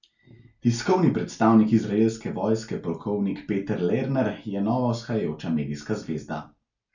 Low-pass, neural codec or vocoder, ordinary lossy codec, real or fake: 7.2 kHz; none; none; real